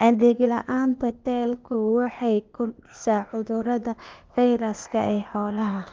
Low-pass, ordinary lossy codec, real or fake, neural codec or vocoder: 7.2 kHz; Opus, 24 kbps; fake; codec, 16 kHz, 0.8 kbps, ZipCodec